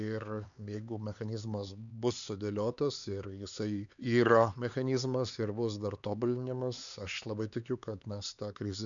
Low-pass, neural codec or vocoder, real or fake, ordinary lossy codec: 7.2 kHz; codec, 16 kHz, 4 kbps, X-Codec, HuBERT features, trained on LibriSpeech; fake; MP3, 96 kbps